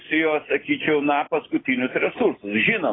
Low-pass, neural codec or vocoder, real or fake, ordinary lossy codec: 7.2 kHz; none; real; AAC, 16 kbps